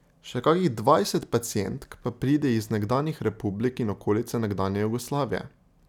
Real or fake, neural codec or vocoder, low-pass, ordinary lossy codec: real; none; 19.8 kHz; none